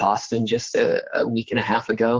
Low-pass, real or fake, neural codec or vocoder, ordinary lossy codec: 7.2 kHz; fake; codec, 16 kHz, 4 kbps, X-Codec, HuBERT features, trained on general audio; Opus, 16 kbps